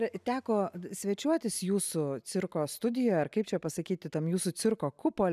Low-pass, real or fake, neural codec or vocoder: 14.4 kHz; real; none